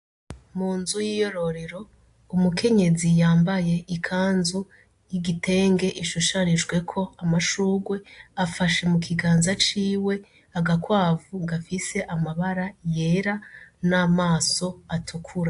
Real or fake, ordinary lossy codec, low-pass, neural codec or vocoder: real; AAC, 64 kbps; 10.8 kHz; none